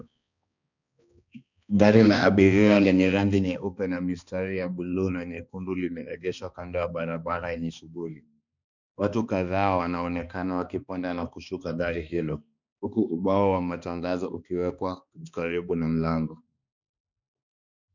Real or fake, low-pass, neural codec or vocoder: fake; 7.2 kHz; codec, 16 kHz, 2 kbps, X-Codec, HuBERT features, trained on balanced general audio